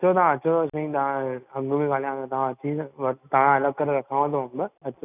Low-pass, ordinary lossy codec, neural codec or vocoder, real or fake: 3.6 kHz; none; none; real